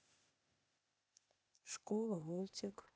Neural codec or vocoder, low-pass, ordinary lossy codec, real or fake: codec, 16 kHz, 0.8 kbps, ZipCodec; none; none; fake